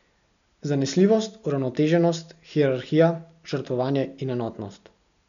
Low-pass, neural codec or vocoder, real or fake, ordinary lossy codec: 7.2 kHz; none; real; none